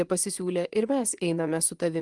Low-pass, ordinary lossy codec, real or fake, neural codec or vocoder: 10.8 kHz; Opus, 24 kbps; fake; vocoder, 24 kHz, 100 mel bands, Vocos